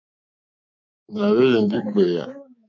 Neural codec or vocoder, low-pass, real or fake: codec, 24 kHz, 3.1 kbps, DualCodec; 7.2 kHz; fake